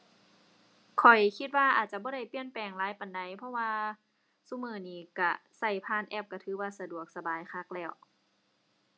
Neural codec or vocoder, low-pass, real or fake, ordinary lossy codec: none; none; real; none